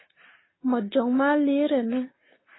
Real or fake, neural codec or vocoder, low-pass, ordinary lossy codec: real; none; 7.2 kHz; AAC, 16 kbps